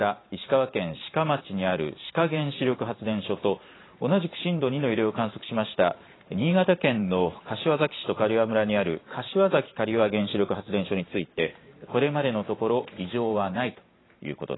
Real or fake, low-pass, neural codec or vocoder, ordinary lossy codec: fake; 7.2 kHz; vocoder, 44.1 kHz, 128 mel bands every 512 samples, BigVGAN v2; AAC, 16 kbps